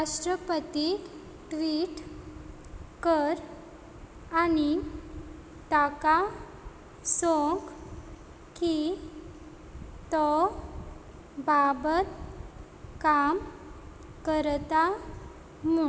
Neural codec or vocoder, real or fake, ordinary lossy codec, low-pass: none; real; none; none